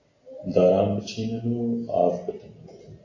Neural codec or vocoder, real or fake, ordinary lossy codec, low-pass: none; real; AAC, 32 kbps; 7.2 kHz